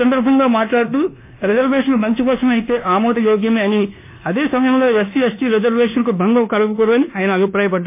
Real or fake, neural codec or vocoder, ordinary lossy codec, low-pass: fake; codec, 24 kHz, 1.2 kbps, DualCodec; MP3, 24 kbps; 3.6 kHz